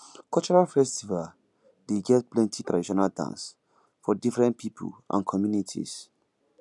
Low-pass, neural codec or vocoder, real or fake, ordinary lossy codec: 10.8 kHz; none; real; none